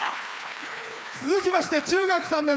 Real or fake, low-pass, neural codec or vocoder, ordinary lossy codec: fake; none; codec, 16 kHz, 2 kbps, FreqCodec, larger model; none